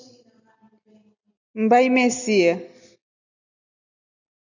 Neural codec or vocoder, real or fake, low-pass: none; real; 7.2 kHz